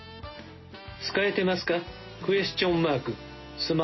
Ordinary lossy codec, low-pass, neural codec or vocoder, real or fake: MP3, 24 kbps; 7.2 kHz; none; real